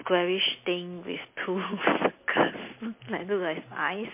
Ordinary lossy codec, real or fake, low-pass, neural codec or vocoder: MP3, 24 kbps; real; 3.6 kHz; none